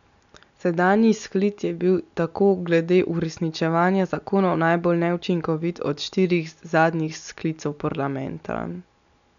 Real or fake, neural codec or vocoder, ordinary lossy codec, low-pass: real; none; none; 7.2 kHz